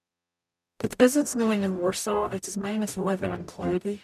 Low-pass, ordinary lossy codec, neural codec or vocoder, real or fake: 14.4 kHz; none; codec, 44.1 kHz, 0.9 kbps, DAC; fake